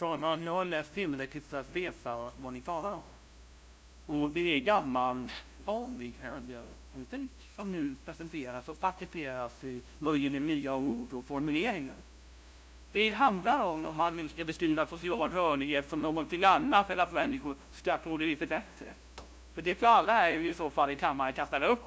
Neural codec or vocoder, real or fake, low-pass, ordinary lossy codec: codec, 16 kHz, 0.5 kbps, FunCodec, trained on LibriTTS, 25 frames a second; fake; none; none